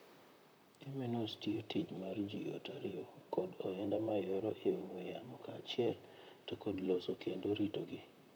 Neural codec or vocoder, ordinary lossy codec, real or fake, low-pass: vocoder, 44.1 kHz, 128 mel bands, Pupu-Vocoder; none; fake; none